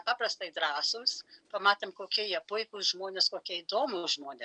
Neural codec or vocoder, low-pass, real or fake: none; 9.9 kHz; real